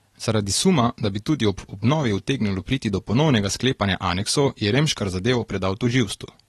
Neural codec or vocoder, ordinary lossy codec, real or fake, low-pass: none; AAC, 32 kbps; real; 19.8 kHz